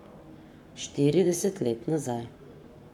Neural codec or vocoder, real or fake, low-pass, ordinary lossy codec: codec, 44.1 kHz, 7.8 kbps, Pupu-Codec; fake; 19.8 kHz; none